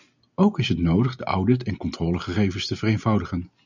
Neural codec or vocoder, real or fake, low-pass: none; real; 7.2 kHz